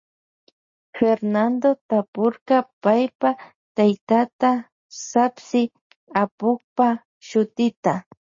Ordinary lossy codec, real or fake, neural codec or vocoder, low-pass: MP3, 32 kbps; real; none; 7.2 kHz